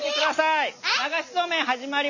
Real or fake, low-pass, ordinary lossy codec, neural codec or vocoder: real; 7.2 kHz; none; none